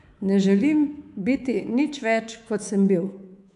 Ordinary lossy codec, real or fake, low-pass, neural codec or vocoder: none; fake; 10.8 kHz; codec, 24 kHz, 3.1 kbps, DualCodec